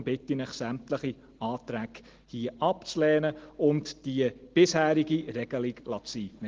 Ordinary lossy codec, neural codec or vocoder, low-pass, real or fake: Opus, 16 kbps; none; 7.2 kHz; real